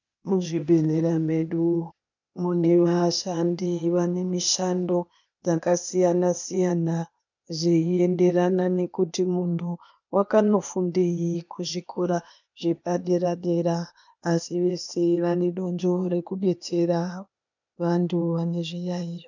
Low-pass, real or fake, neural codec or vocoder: 7.2 kHz; fake; codec, 16 kHz, 0.8 kbps, ZipCodec